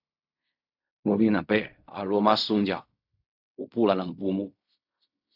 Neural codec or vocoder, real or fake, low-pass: codec, 16 kHz in and 24 kHz out, 0.4 kbps, LongCat-Audio-Codec, fine tuned four codebook decoder; fake; 5.4 kHz